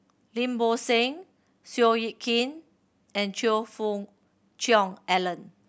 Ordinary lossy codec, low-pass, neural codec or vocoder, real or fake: none; none; none; real